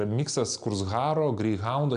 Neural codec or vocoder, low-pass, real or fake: none; 9.9 kHz; real